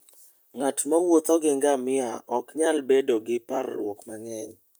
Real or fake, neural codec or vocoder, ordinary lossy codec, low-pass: fake; vocoder, 44.1 kHz, 128 mel bands, Pupu-Vocoder; none; none